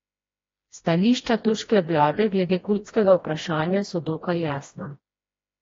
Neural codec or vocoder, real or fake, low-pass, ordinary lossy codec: codec, 16 kHz, 1 kbps, FreqCodec, smaller model; fake; 7.2 kHz; AAC, 32 kbps